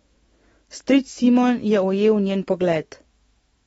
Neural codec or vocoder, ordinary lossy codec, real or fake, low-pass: autoencoder, 48 kHz, 128 numbers a frame, DAC-VAE, trained on Japanese speech; AAC, 24 kbps; fake; 19.8 kHz